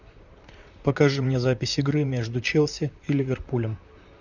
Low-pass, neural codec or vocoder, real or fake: 7.2 kHz; vocoder, 44.1 kHz, 128 mel bands, Pupu-Vocoder; fake